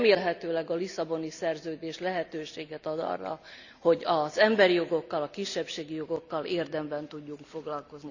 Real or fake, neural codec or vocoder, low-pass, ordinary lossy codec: real; none; 7.2 kHz; none